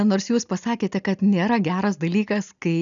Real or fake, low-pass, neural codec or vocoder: real; 7.2 kHz; none